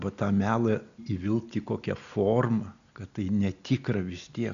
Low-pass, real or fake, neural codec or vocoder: 7.2 kHz; real; none